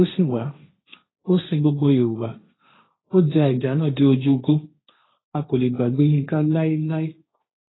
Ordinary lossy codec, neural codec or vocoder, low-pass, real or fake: AAC, 16 kbps; codec, 16 kHz, 1.1 kbps, Voila-Tokenizer; 7.2 kHz; fake